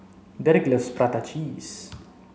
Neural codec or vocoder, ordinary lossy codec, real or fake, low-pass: none; none; real; none